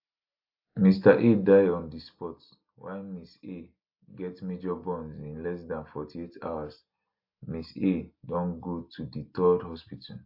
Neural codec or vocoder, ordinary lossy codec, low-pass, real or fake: none; AAC, 48 kbps; 5.4 kHz; real